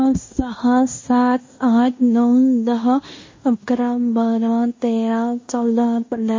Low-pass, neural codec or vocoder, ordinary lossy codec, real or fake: 7.2 kHz; codec, 16 kHz in and 24 kHz out, 0.9 kbps, LongCat-Audio-Codec, fine tuned four codebook decoder; MP3, 32 kbps; fake